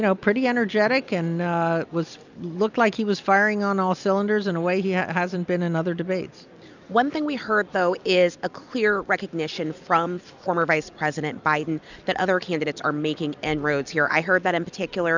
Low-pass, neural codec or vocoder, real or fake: 7.2 kHz; none; real